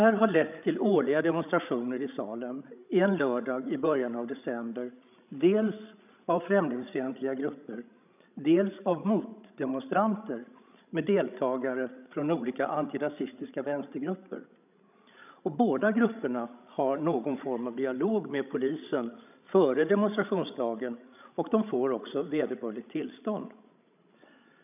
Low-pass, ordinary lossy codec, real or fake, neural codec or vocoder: 3.6 kHz; none; fake; codec, 16 kHz, 16 kbps, FreqCodec, larger model